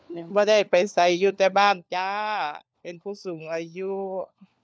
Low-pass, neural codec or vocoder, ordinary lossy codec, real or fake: none; codec, 16 kHz, 4 kbps, FunCodec, trained on LibriTTS, 50 frames a second; none; fake